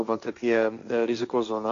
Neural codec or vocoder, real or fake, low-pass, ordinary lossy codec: codec, 16 kHz, 1.1 kbps, Voila-Tokenizer; fake; 7.2 kHz; AAC, 64 kbps